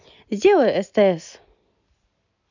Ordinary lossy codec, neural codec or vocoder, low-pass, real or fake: none; none; 7.2 kHz; real